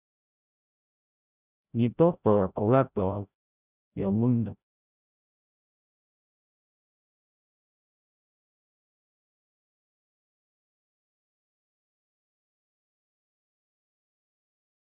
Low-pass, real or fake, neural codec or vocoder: 3.6 kHz; fake; codec, 16 kHz, 0.5 kbps, FreqCodec, larger model